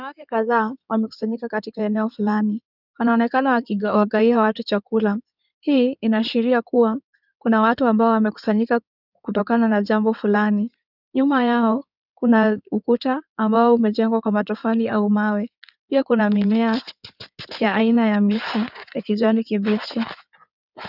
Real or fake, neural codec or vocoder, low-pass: fake; codec, 16 kHz in and 24 kHz out, 2.2 kbps, FireRedTTS-2 codec; 5.4 kHz